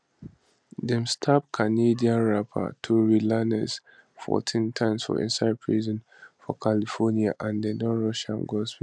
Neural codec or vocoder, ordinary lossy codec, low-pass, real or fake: none; none; 9.9 kHz; real